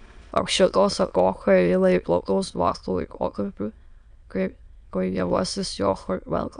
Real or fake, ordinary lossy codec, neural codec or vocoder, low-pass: fake; MP3, 96 kbps; autoencoder, 22.05 kHz, a latent of 192 numbers a frame, VITS, trained on many speakers; 9.9 kHz